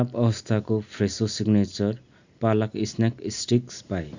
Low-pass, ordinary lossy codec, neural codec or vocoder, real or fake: 7.2 kHz; Opus, 64 kbps; none; real